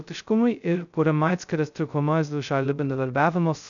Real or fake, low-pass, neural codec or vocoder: fake; 7.2 kHz; codec, 16 kHz, 0.2 kbps, FocalCodec